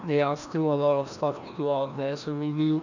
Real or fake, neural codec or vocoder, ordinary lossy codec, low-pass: fake; codec, 16 kHz, 1 kbps, FreqCodec, larger model; none; 7.2 kHz